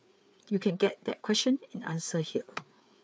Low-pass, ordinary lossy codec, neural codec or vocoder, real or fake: none; none; codec, 16 kHz, 4 kbps, FreqCodec, larger model; fake